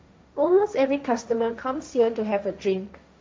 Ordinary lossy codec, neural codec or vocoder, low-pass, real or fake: none; codec, 16 kHz, 1.1 kbps, Voila-Tokenizer; none; fake